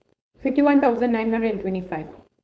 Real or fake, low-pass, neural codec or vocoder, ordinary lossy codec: fake; none; codec, 16 kHz, 4.8 kbps, FACodec; none